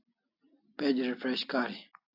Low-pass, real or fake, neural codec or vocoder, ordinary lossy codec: 5.4 kHz; real; none; AAC, 48 kbps